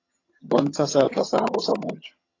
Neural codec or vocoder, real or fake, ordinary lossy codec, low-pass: vocoder, 22.05 kHz, 80 mel bands, HiFi-GAN; fake; AAC, 32 kbps; 7.2 kHz